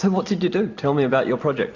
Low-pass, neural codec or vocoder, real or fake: 7.2 kHz; none; real